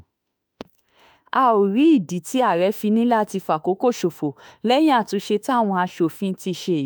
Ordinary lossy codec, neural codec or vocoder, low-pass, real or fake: none; autoencoder, 48 kHz, 32 numbers a frame, DAC-VAE, trained on Japanese speech; none; fake